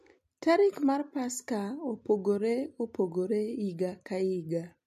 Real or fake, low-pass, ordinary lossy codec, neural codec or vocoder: real; 14.4 kHz; MP3, 64 kbps; none